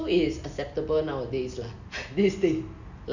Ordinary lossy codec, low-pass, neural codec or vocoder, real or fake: none; 7.2 kHz; none; real